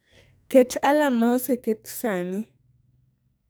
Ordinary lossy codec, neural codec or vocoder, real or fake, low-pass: none; codec, 44.1 kHz, 2.6 kbps, SNAC; fake; none